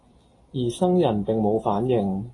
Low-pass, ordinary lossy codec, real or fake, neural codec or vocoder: 10.8 kHz; AAC, 32 kbps; real; none